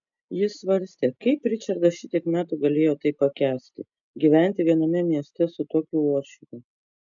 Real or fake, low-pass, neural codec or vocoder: real; 7.2 kHz; none